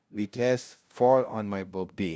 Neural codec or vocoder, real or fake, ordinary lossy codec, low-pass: codec, 16 kHz, 0.5 kbps, FunCodec, trained on LibriTTS, 25 frames a second; fake; none; none